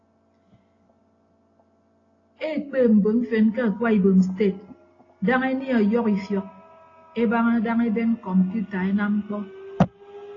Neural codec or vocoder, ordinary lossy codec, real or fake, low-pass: none; AAC, 32 kbps; real; 7.2 kHz